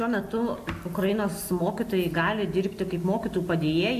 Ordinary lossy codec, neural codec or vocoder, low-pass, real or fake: AAC, 64 kbps; none; 14.4 kHz; real